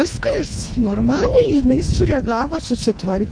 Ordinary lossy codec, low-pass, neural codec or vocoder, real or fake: AAC, 64 kbps; 9.9 kHz; codec, 24 kHz, 1.5 kbps, HILCodec; fake